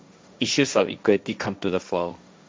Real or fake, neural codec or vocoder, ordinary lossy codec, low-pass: fake; codec, 16 kHz, 1.1 kbps, Voila-Tokenizer; none; none